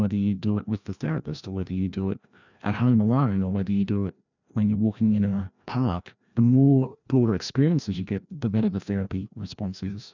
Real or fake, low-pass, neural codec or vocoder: fake; 7.2 kHz; codec, 16 kHz, 1 kbps, FreqCodec, larger model